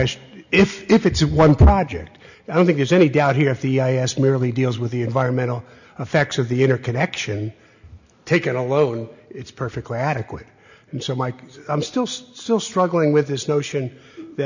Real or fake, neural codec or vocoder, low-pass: real; none; 7.2 kHz